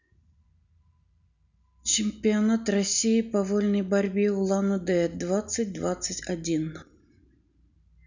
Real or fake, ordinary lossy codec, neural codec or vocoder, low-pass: real; none; none; 7.2 kHz